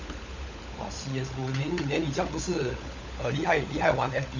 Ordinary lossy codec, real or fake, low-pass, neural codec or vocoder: none; fake; 7.2 kHz; codec, 16 kHz, 16 kbps, FunCodec, trained on LibriTTS, 50 frames a second